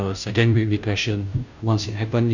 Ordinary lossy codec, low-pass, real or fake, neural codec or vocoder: none; 7.2 kHz; fake; codec, 16 kHz, 0.5 kbps, FunCodec, trained on Chinese and English, 25 frames a second